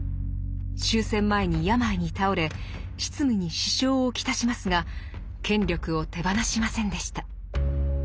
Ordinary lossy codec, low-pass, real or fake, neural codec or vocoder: none; none; real; none